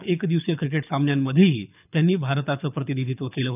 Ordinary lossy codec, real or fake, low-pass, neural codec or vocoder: none; fake; 3.6 kHz; codec, 24 kHz, 6 kbps, HILCodec